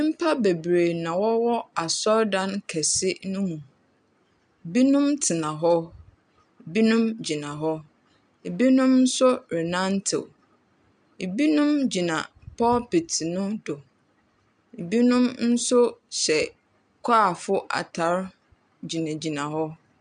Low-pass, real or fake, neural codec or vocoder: 9.9 kHz; real; none